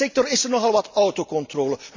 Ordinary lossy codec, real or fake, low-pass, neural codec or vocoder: MP3, 64 kbps; real; 7.2 kHz; none